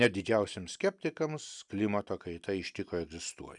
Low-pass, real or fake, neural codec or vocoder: 10.8 kHz; real; none